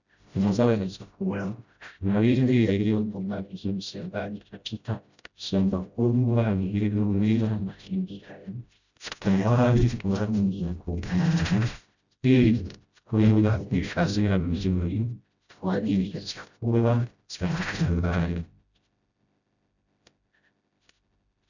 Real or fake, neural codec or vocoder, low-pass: fake; codec, 16 kHz, 0.5 kbps, FreqCodec, smaller model; 7.2 kHz